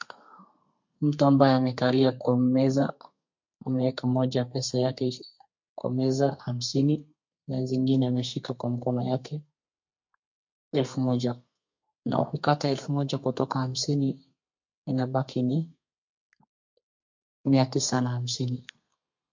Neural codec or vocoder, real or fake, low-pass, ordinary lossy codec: codec, 32 kHz, 1.9 kbps, SNAC; fake; 7.2 kHz; MP3, 48 kbps